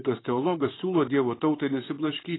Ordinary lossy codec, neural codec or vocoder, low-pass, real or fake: AAC, 16 kbps; vocoder, 44.1 kHz, 128 mel bands, Pupu-Vocoder; 7.2 kHz; fake